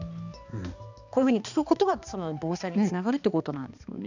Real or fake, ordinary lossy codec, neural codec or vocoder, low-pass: fake; none; codec, 16 kHz, 2 kbps, X-Codec, HuBERT features, trained on balanced general audio; 7.2 kHz